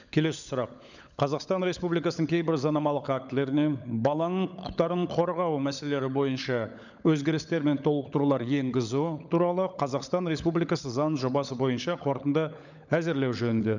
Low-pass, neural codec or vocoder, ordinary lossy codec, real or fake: 7.2 kHz; codec, 16 kHz, 16 kbps, FunCodec, trained on LibriTTS, 50 frames a second; none; fake